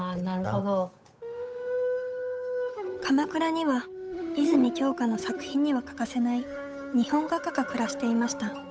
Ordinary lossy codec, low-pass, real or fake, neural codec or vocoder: none; none; fake; codec, 16 kHz, 8 kbps, FunCodec, trained on Chinese and English, 25 frames a second